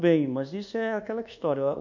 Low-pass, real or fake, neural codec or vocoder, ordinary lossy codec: 7.2 kHz; fake; codec, 24 kHz, 1.2 kbps, DualCodec; none